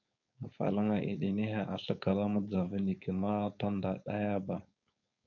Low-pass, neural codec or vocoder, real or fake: 7.2 kHz; codec, 16 kHz, 4.8 kbps, FACodec; fake